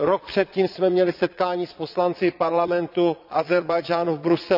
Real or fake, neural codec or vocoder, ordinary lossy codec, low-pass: fake; vocoder, 22.05 kHz, 80 mel bands, Vocos; none; 5.4 kHz